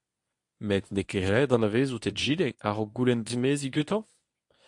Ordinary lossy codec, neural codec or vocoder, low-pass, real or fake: AAC, 64 kbps; codec, 24 kHz, 0.9 kbps, WavTokenizer, medium speech release version 1; 10.8 kHz; fake